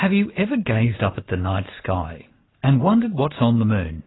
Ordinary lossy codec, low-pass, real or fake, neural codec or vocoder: AAC, 16 kbps; 7.2 kHz; real; none